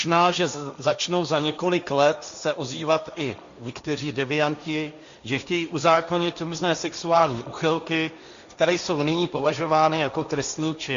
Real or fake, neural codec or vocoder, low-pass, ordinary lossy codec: fake; codec, 16 kHz, 1.1 kbps, Voila-Tokenizer; 7.2 kHz; Opus, 64 kbps